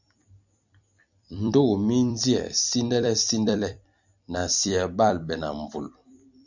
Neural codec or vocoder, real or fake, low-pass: vocoder, 44.1 kHz, 128 mel bands every 256 samples, BigVGAN v2; fake; 7.2 kHz